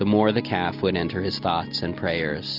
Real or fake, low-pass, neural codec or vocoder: real; 5.4 kHz; none